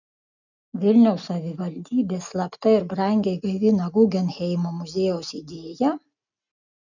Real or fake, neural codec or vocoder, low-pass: real; none; 7.2 kHz